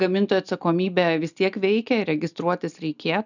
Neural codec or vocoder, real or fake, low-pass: none; real; 7.2 kHz